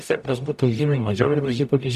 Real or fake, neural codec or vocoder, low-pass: fake; codec, 44.1 kHz, 0.9 kbps, DAC; 14.4 kHz